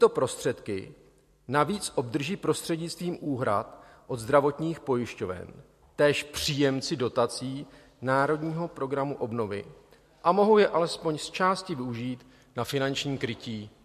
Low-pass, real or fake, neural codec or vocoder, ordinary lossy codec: 14.4 kHz; real; none; MP3, 64 kbps